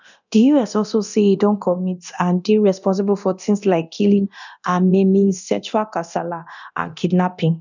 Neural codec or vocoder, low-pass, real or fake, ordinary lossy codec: codec, 24 kHz, 0.9 kbps, DualCodec; 7.2 kHz; fake; none